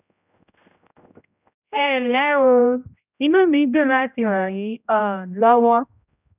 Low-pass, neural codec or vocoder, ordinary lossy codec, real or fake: 3.6 kHz; codec, 16 kHz, 0.5 kbps, X-Codec, HuBERT features, trained on general audio; none; fake